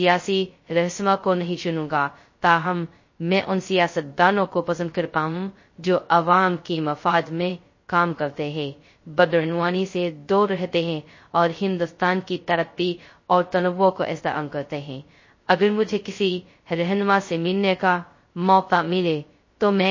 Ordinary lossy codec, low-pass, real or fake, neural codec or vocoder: MP3, 32 kbps; 7.2 kHz; fake; codec, 16 kHz, 0.2 kbps, FocalCodec